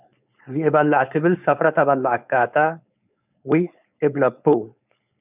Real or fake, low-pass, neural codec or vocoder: fake; 3.6 kHz; codec, 16 kHz, 4.8 kbps, FACodec